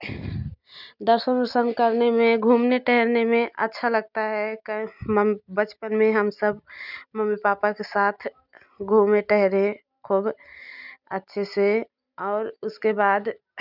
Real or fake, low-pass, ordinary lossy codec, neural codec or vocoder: real; 5.4 kHz; none; none